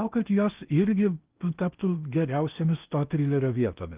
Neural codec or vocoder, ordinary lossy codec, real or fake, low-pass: codec, 16 kHz in and 24 kHz out, 0.8 kbps, FocalCodec, streaming, 65536 codes; Opus, 24 kbps; fake; 3.6 kHz